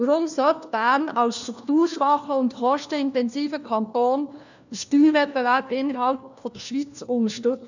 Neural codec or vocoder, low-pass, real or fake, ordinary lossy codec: codec, 16 kHz, 1 kbps, FunCodec, trained on LibriTTS, 50 frames a second; 7.2 kHz; fake; none